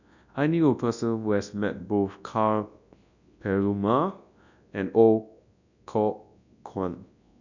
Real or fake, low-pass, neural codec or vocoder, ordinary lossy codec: fake; 7.2 kHz; codec, 24 kHz, 0.9 kbps, WavTokenizer, large speech release; none